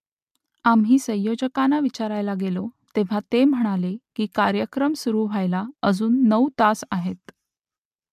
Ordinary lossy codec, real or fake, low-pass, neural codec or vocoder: MP3, 96 kbps; real; 14.4 kHz; none